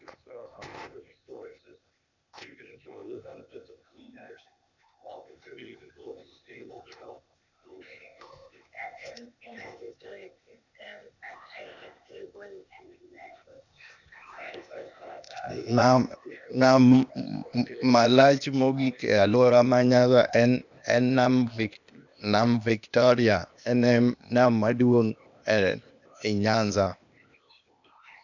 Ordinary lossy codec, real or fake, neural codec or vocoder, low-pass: none; fake; codec, 16 kHz, 0.8 kbps, ZipCodec; 7.2 kHz